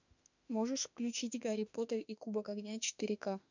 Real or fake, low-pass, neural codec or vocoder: fake; 7.2 kHz; autoencoder, 48 kHz, 32 numbers a frame, DAC-VAE, trained on Japanese speech